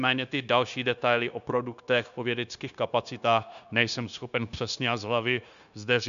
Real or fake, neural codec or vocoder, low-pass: fake; codec, 16 kHz, 0.9 kbps, LongCat-Audio-Codec; 7.2 kHz